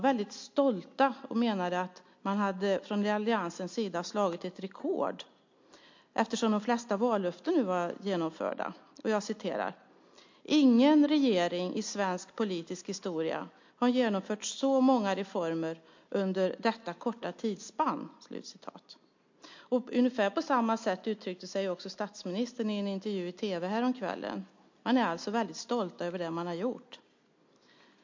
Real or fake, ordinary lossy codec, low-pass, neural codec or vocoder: real; MP3, 48 kbps; 7.2 kHz; none